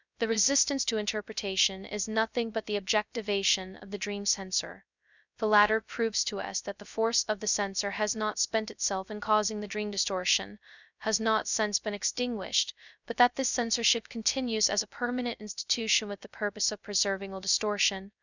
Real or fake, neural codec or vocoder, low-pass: fake; codec, 16 kHz, 0.2 kbps, FocalCodec; 7.2 kHz